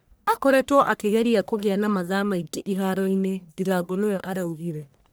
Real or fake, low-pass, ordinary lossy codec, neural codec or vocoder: fake; none; none; codec, 44.1 kHz, 1.7 kbps, Pupu-Codec